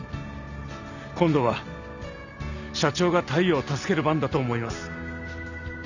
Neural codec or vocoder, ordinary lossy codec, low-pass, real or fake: none; none; 7.2 kHz; real